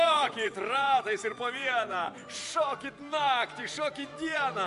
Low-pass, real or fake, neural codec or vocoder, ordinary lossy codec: 10.8 kHz; fake; vocoder, 24 kHz, 100 mel bands, Vocos; AAC, 64 kbps